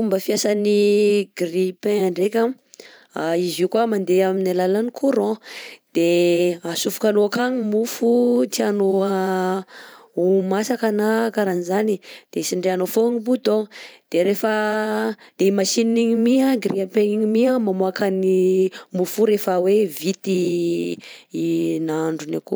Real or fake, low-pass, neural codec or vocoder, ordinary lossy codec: fake; none; vocoder, 44.1 kHz, 128 mel bands every 512 samples, BigVGAN v2; none